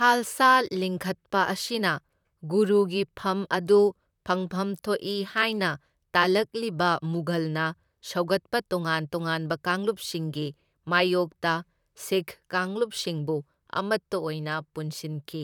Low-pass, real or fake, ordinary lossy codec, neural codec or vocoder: 19.8 kHz; fake; none; vocoder, 44.1 kHz, 128 mel bands, Pupu-Vocoder